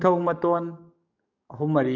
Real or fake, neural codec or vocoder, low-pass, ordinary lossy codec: fake; codec, 44.1 kHz, 7.8 kbps, DAC; 7.2 kHz; none